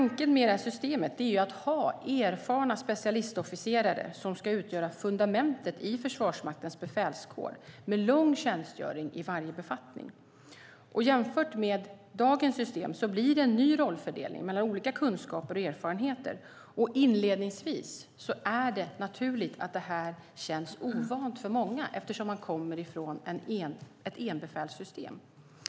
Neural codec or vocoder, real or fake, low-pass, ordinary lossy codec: none; real; none; none